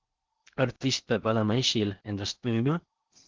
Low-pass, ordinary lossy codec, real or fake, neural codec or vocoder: 7.2 kHz; Opus, 32 kbps; fake; codec, 16 kHz in and 24 kHz out, 0.6 kbps, FocalCodec, streaming, 4096 codes